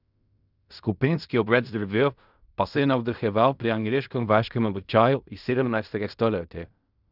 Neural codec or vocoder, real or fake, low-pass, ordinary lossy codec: codec, 16 kHz in and 24 kHz out, 0.4 kbps, LongCat-Audio-Codec, fine tuned four codebook decoder; fake; 5.4 kHz; none